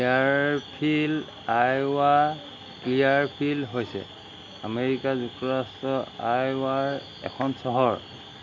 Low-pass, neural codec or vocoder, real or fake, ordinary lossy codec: 7.2 kHz; none; real; MP3, 64 kbps